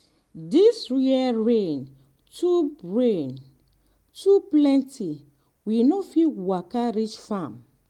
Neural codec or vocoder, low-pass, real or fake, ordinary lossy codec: none; 19.8 kHz; real; Opus, 24 kbps